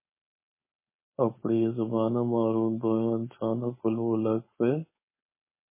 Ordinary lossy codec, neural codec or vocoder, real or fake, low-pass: MP3, 16 kbps; codec, 16 kHz, 4.8 kbps, FACodec; fake; 3.6 kHz